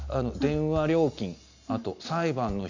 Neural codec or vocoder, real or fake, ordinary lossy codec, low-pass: none; real; none; 7.2 kHz